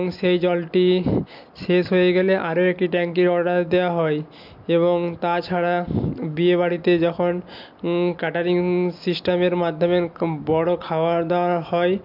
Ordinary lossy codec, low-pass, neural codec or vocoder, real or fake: MP3, 48 kbps; 5.4 kHz; none; real